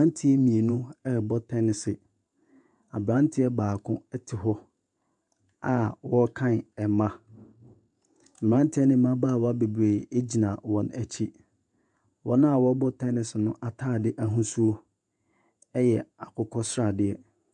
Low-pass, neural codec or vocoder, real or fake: 10.8 kHz; vocoder, 48 kHz, 128 mel bands, Vocos; fake